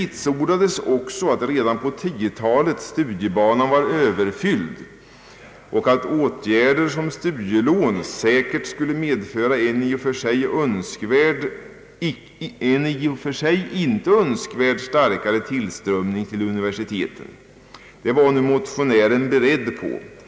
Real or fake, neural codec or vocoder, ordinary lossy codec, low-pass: real; none; none; none